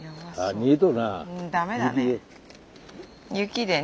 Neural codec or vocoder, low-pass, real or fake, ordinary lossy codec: none; none; real; none